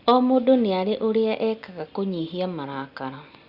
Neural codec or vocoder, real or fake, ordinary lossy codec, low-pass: none; real; Opus, 64 kbps; 5.4 kHz